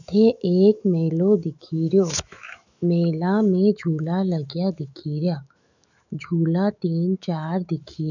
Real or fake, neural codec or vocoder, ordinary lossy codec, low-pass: fake; autoencoder, 48 kHz, 128 numbers a frame, DAC-VAE, trained on Japanese speech; none; 7.2 kHz